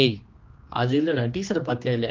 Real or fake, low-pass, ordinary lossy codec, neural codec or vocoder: fake; 7.2 kHz; Opus, 24 kbps; codec, 16 kHz, 2 kbps, X-Codec, HuBERT features, trained on general audio